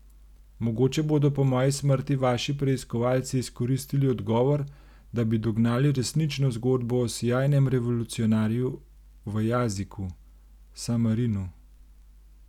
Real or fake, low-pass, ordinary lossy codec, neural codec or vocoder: real; 19.8 kHz; none; none